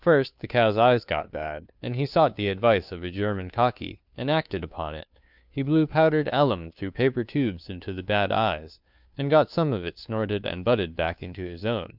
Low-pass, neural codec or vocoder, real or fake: 5.4 kHz; codec, 16 kHz, 2 kbps, FunCodec, trained on Chinese and English, 25 frames a second; fake